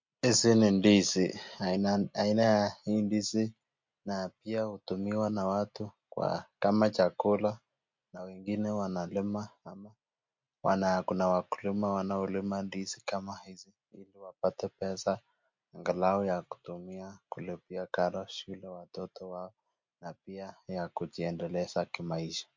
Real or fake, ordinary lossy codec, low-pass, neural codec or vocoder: real; MP3, 48 kbps; 7.2 kHz; none